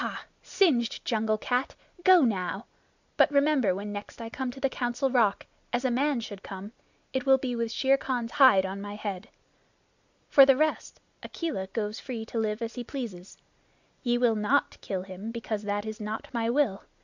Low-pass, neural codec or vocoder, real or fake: 7.2 kHz; none; real